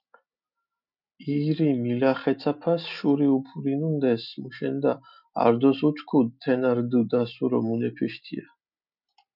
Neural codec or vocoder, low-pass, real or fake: none; 5.4 kHz; real